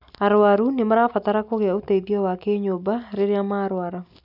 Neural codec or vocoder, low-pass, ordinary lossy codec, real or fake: none; 5.4 kHz; none; real